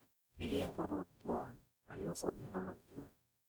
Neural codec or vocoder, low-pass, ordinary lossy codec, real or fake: codec, 44.1 kHz, 0.9 kbps, DAC; none; none; fake